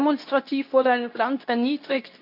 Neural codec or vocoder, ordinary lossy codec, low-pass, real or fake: codec, 24 kHz, 0.9 kbps, WavTokenizer, medium speech release version 2; none; 5.4 kHz; fake